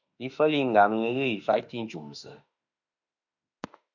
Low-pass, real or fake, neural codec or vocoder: 7.2 kHz; fake; autoencoder, 48 kHz, 32 numbers a frame, DAC-VAE, trained on Japanese speech